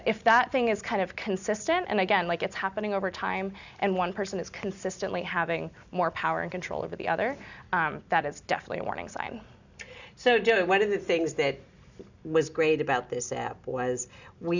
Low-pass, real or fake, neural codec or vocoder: 7.2 kHz; real; none